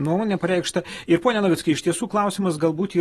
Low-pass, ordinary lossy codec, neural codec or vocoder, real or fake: 14.4 kHz; AAC, 32 kbps; none; real